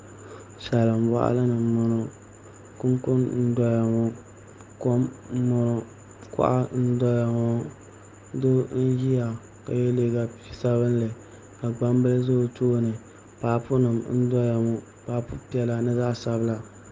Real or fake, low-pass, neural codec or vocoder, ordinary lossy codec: real; 7.2 kHz; none; Opus, 24 kbps